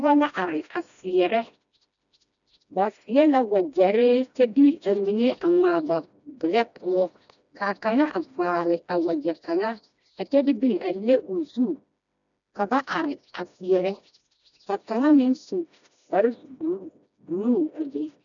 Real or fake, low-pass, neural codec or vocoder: fake; 7.2 kHz; codec, 16 kHz, 1 kbps, FreqCodec, smaller model